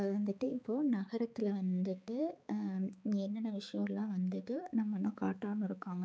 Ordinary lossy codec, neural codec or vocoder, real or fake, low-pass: none; codec, 16 kHz, 4 kbps, X-Codec, HuBERT features, trained on balanced general audio; fake; none